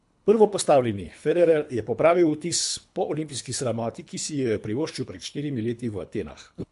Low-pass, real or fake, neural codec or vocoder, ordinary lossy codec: 10.8 kHz; fake; codec, 24 kHz, 3 kbps, HILCodec; MP3, 64 kbps